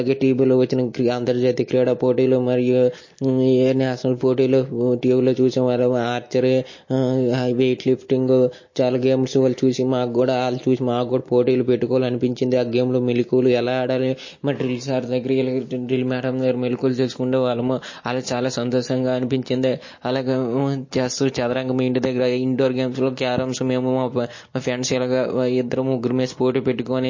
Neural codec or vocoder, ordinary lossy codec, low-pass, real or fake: none; MP3, 32 kbps; 7.2 kHz; real